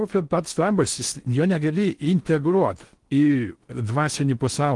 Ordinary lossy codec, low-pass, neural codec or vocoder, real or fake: Opus, 24 kbps; 10.8 kHz; codec, 16 kHz in and 24 kHz out, 0.6 kbps, FocalCodec, streaming, 4096 codes; fake